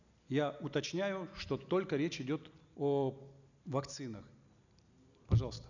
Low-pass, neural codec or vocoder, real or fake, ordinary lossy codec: 7.2 kHz; none; real; none